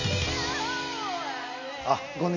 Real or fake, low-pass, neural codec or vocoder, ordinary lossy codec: real; 7.2 kHz; none; none